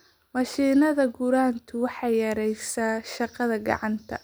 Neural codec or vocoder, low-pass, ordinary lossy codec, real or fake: none; none; none; real